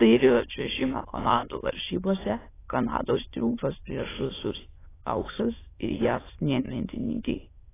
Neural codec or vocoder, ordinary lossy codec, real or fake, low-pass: autoencoder, 22.05 kHz, a latent of 192 numbers a frame, VITS, trained on many speakers; AAC, 16 kbps; fake; 3.6 kHz